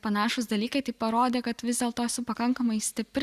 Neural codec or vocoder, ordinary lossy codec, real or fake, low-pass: none; Opus, 64 kbps; real; 14.4 kHz